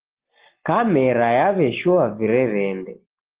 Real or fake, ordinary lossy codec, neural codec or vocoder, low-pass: real; Opus, 16 kbps; none; 3.6 kHz